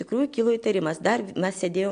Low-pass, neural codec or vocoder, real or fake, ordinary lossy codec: 9.9 kHz; none; real; AAC, 64 kbps